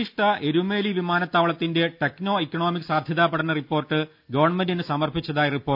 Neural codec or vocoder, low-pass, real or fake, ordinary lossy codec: none; 5.4 kHz; real; none